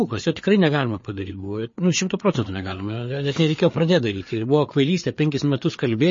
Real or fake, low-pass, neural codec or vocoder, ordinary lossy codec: fake; 7.2 kHz; codec, 16 kHz, 4 kbps, FreqCodec, larger model; MP3, 32 kbps